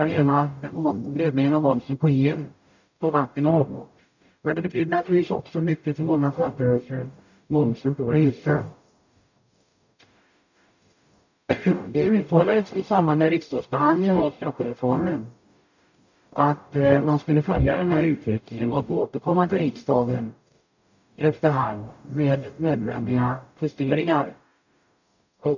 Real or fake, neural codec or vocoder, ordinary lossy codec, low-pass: fake; codec, 44.1 kHz, 0.9 kbps, DAC; none; 7.2 kHz